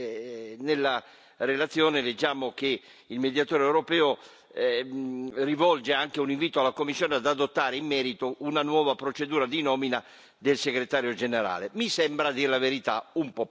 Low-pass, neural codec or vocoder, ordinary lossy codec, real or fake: none; none; none; real